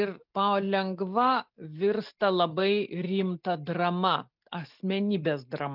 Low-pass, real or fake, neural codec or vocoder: 5.4 kHz; real; none